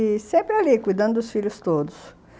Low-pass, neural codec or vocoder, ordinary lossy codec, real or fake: none; none; none; real